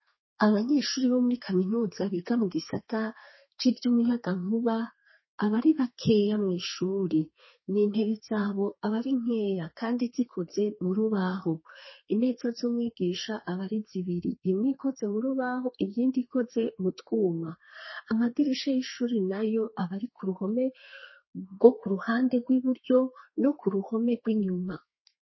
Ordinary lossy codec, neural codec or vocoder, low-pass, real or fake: MP3, 24 kbps; codec, 32 kHz, 1.9 kbps, SNAC; 7.2 kHz; fake